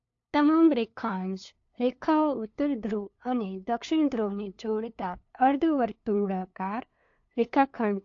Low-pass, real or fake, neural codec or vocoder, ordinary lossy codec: 7.2 kHz; fake; codec, 16 kHz, 2 kbps, FunCodec, trained on LibriTTS, 25 frames a second; AAC, 48 kbps